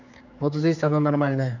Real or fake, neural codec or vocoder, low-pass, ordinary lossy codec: fake; codec, 16 kHz, 4 kbps, X-Codec, HuBERT features, trained on general audio; 7.2 kHz; none